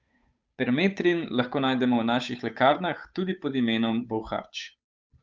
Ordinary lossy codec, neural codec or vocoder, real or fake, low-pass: none; codec, 16 kHz, 8 kbps, FunCodec, trained on Chinese and English, 25 frames a second; fake; none